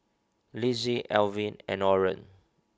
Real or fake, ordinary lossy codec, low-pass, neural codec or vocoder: real; none; none; none